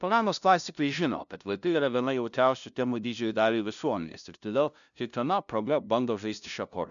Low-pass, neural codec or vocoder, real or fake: 7.2 kHz; codec, 16 kHz, 0.5 kbps, FunCodec, trained on LibriTTS, 25 frames a second; fake